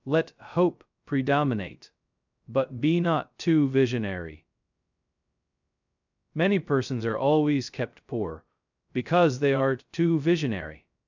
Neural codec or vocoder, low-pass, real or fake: codec, 16 kHz, 0.2 kbps, FocalCodec; 7.2 kHz; fake